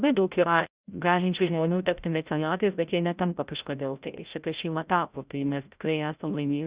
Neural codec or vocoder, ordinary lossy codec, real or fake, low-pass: codec, 16 kHz, 0.5 kbps, FreqCodec, larger model; Opus, 64 kbps; fake; 3.6 kHz